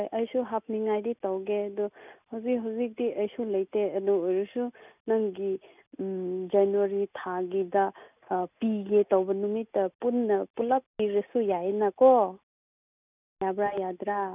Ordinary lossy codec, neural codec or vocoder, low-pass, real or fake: none; none; 3.6 kHz; real